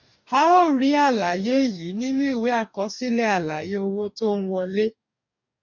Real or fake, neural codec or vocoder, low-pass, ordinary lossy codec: fake; codec, 44.1 kHz, 2.6 kbps, DAC; 7.2 kHz; none